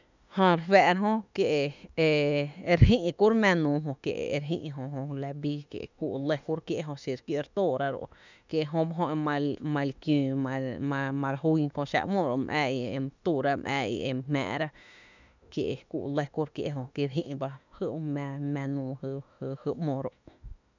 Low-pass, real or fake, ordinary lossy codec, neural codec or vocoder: 7.2 kHz; fake; none; autoencoder, 48 kHz, 32 numbers a frame, DAC-VAE, trained on Japanese speech